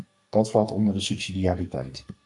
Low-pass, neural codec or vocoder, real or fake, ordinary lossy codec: 10.8 kHz; codec, 44.1 kHz, 2.6 kbps, SNAC; fake; AAC, 64 kbps